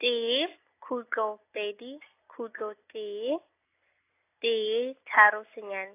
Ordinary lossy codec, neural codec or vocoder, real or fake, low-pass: AAC, 24 kbps; none; real; 3.6 kHz